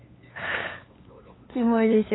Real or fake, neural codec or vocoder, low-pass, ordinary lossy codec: fake; codec, 16 kHz, 8 kbps, FunCodec, trained on LibriTTS, 25 frames a second; 7.2 kHz; AAC, 16 kbps